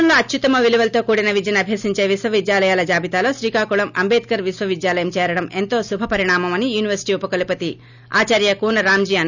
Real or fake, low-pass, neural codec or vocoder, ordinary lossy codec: real; 7.2 kHz; none; none